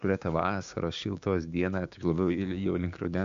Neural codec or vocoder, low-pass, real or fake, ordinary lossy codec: codec, 16 kHz, 6 kbps, DAC; 7.2 kHz; fake; MP3, 64 kbps